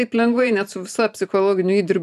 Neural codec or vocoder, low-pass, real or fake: vocoder, 44.1 kHz, 128 mel bands every 512 samples, BigVGAN v2; 14.4 kHz; fake